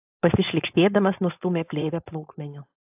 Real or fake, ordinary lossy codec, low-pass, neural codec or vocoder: fake; AAC, 32 kbps; 3.6 kHz; codec, 16 kHz, 4.8 kbps, FACodec